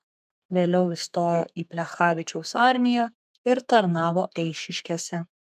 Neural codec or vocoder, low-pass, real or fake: codec, 32 kHz, 1.9 kbps, SNAC; 14.4 kHz; fake